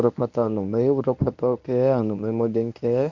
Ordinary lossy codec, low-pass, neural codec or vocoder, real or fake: none; 7.2 kHz; codec, 24 kHz, 0.9 kbps, WavTokenizer, medium speech release version 1; fake